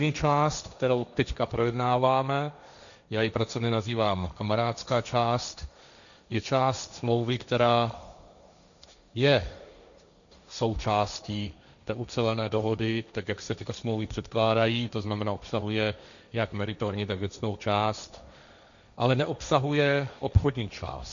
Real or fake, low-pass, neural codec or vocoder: fake; 7.2 kHz; codec, 16 kHz, 1.1 kbps, Voila-Tokenizer